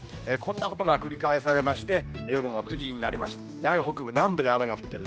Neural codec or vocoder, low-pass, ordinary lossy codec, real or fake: codec, 16 kHz, 1 kbps, X-Codec, HuBERT features, trained on general audio; none; none; fake